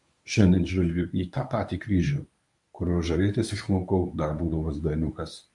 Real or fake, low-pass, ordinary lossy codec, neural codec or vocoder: fake; 10.8 kHz; MP3, 64 kbps; codec, 24 kHz, 0.9 kbps, WavTokenizer, medium speech release version 1